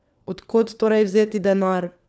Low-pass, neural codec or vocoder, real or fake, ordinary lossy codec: none; codec, 16 kHz, 4 kbps, FunCodec, trained on LibriTTS, 50 frames a second; fake; none